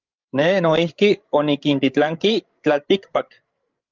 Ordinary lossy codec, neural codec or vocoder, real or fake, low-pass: Opus, 32 kbps; codec, 16 kHz, 16 kbps, FreqCodec, larger model; fake; 7.2 kHz